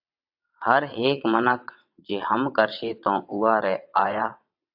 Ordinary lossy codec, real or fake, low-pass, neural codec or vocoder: AAC, 48 kbps; fake; 5.4 kHz; vocoder, 22.05 kHz, 80 mel bands, WaveNeXt